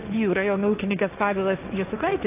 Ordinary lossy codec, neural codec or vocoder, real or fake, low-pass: AAC, 32 kbps; codec, 16 kHz, 1.1 kbps, Voila-Tokenizer; fake; 3.6 kHz